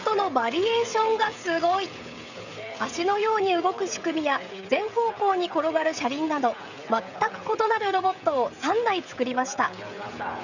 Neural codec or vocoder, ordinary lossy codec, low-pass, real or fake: codec, 16 kHz, 16 kbps, FreqCodec, smaller model; none; 7.2 kHz; fake